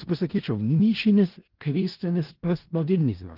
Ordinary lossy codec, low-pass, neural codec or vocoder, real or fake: Opus, 16 kbps; 5.4 kHz; codec, 16 kHz in and 24 kHz out, 0.4 kbps, LongCat-Audio-Codec, four codebook decoder; fake